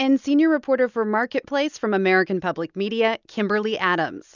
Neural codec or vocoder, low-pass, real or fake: none; 7.2 kHz; real